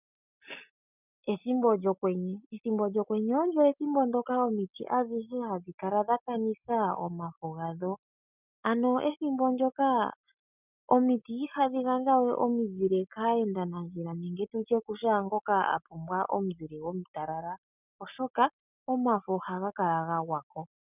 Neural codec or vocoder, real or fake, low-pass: none; real; 3.6 kHz